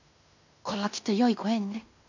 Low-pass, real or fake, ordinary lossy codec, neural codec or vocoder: 7.2 kHz; fake; none; codec, 16 kHz in and 24 kHz out, 0.9 kbps, LongCat-Audio-Codec, fine tuned four codebook decoder